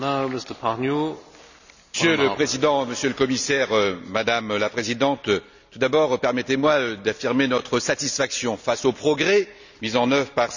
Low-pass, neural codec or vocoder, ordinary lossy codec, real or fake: 7.2 kHz; none; none; real